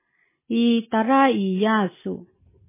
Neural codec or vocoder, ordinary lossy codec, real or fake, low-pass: none; MP3, 16 kbps; real; 3.6 kHz